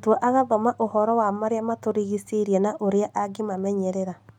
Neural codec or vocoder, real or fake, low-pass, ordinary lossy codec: none; real; 19.8 kHz; none